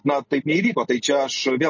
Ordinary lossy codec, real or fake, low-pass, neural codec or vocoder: MP3, 32 kbps; real; 7.2 kHz; none